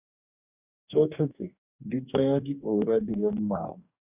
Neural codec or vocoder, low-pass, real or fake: codec, 44.1 kHz, 2.6 kbps, DAC; 3.6 kHz; fake